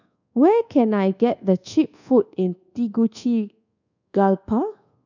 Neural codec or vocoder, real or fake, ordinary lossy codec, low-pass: codec, 24 kHz, 1.2 kbps, DualCodec; fake; none; 7.2 kHz